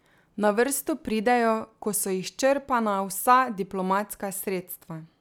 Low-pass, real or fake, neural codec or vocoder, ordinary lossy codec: none; real; none; none